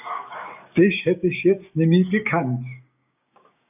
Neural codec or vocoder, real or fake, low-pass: codec, 16 kHz, 6 kbps, DAC; fake; 3.6 kHz